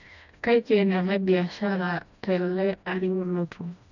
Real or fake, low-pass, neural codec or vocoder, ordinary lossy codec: fake; 7.2 kHz; codec, 16 kHz, 1 kbps, FreqCodec, smaller model; none